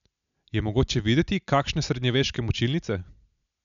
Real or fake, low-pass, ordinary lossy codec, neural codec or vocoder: real; 7.2 kHz; none; none